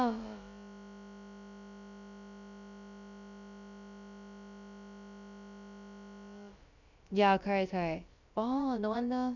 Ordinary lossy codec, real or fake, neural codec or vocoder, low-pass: none; fake; codec, 16 kHz, about 1 kbps, DyCAST, with the encoder's durations; 7.2 kHz